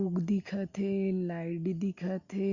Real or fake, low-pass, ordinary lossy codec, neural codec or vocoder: real; 7.2 kHz; Opus, 64 kbps; none